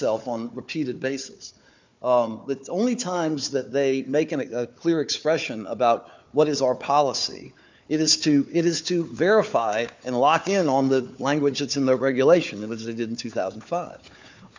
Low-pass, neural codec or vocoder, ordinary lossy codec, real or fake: 7.2 kHz; codec, 16 kHz, 4 kbps, FunCodec, trained on Chinese and English, 50 frames a second; MP3, 64 kbps; fake